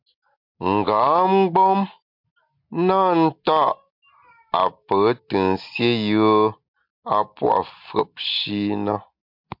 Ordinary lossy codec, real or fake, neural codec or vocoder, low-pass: MP3, 48 kbps; real; none; 5.4 kHz